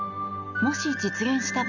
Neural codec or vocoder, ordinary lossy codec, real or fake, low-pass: none; none; real; 7.2 kHz